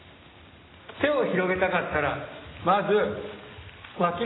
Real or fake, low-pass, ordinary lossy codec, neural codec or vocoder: real; 7.2 kHz; AAC, 16 kbps; none